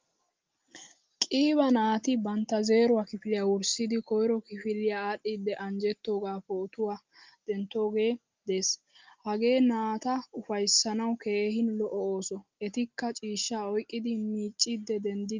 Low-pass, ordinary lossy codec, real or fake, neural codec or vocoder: 7.2 kHz; Opus, 32 kbps; real; none